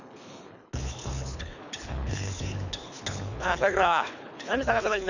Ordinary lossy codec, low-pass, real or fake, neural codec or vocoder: none; 7.2 kHz; fake; codec, 24 kHz, 3 kbps, HILCodec